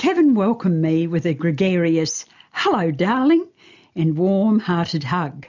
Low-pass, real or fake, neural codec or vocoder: 7.2 kHz; real; none